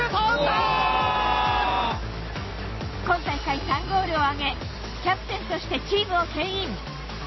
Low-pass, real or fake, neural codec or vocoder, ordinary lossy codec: 7.2 kHz; real; none; MP3, 24 kbps